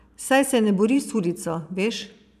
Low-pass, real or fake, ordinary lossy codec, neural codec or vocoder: 14.4 kHz; fake; none; vocoder, 44.1 kHz, 128 mel bands every 512 samples, BigVGAN v2